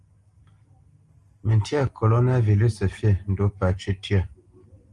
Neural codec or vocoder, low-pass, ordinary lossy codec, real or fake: none; 10.8 kHz; Opus, 24 kbps; real